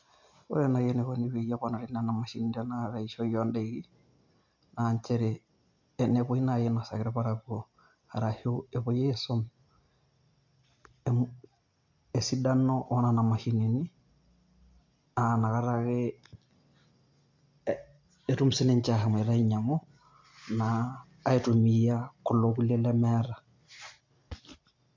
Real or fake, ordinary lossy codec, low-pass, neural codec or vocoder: real; MP3, 48 kbps; 7.2 kHz; none